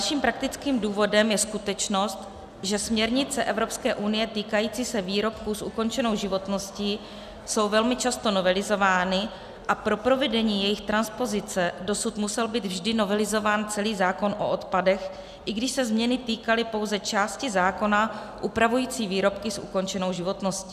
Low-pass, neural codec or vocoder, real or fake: 14.4 kHz; none; real